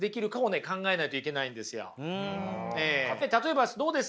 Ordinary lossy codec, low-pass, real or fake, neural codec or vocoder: none; none; real; none